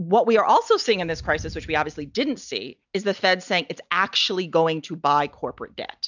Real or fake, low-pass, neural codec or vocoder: real; 7.2 kHz; none